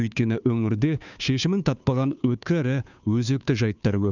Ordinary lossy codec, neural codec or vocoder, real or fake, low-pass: none; codec, 16 kHz, 2 kbps, FunCodec, trained on Chinese and English, 25 frames a second; fake; 7.2 kHz